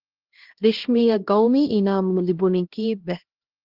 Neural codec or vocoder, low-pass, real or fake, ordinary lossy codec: codec, 16 kHz, 1 kbps, X-Codec, HuBERT features, trained on LibriSpeech; 5.4 kHz; fake; Opus, 16 kbps